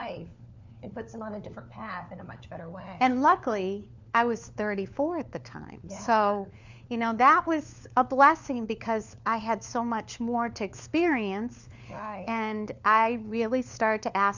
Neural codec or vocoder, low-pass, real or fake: codec, 16 kHz, 4 kbps, FunCodec, trained on LibriTTS, 50 frames a second; 7.2 kHz; fake